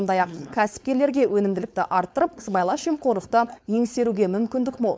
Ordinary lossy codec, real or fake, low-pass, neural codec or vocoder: none; fake; none; codec, 16 kHz, 4.8 kbps, FACodec